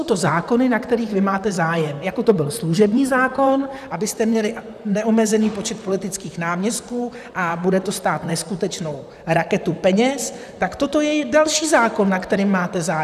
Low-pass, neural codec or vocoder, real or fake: 14.4 kHz; vocoder, 44.1 kHz, 128 mel bands, Pupu-Vocoder; fake